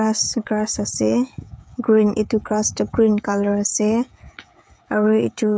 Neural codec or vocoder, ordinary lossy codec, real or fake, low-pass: codec, 16 kHz, 16 kbps, FreqCodec, smaller model; none; fake; none